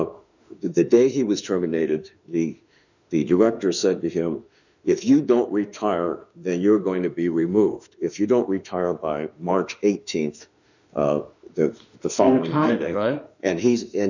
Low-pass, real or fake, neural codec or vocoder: 7.2 kHz; fake; autoencoder, 48 kHz, 32 numbers a frame, DAC-VAE, trained on Japanese speech